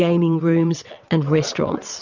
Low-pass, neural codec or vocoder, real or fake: 7.2 kHz; vocoder, 22.05 kHz, 80 mel bands, WaveNeXt; fake